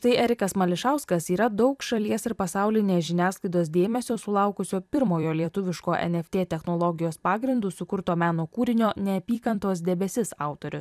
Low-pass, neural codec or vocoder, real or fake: 14.4 kHz; vocoder, 44.1 kHz, 128 mel bands every 256 samples, BigVGAN v2; fake